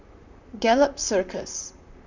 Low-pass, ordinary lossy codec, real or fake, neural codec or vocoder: 7.2 kHz; none; fake; vocoder, 44.1 kHz, 128 mel bands, Pupu-Vocoder